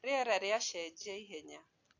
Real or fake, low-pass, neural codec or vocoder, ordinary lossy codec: real; 7.2 kHz; none; AAC, 48 kbps